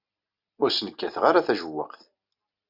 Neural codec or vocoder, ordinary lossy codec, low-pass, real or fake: none; Opus, 64 kbps; 5.4 kHz; real